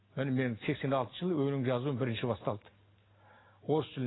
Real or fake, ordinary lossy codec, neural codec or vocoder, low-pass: real; AAC, 16 kbps; none; 7.2 kHz